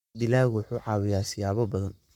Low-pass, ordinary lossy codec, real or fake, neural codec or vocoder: 19.8 kHz; MP3, 96 kbps; fake; codec, 44.1 kHz, 7.8 kbps, Pupu-Codec